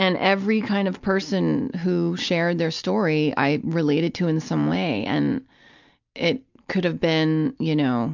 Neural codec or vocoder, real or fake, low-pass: none; real; 7.2 kHz